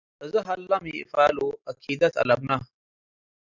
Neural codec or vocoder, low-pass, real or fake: none; 7.2 kHz; real